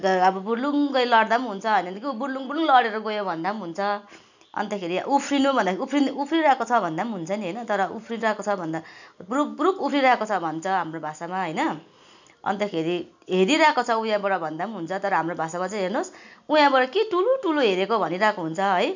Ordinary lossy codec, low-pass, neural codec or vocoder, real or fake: none; 7.2 kHz; none; real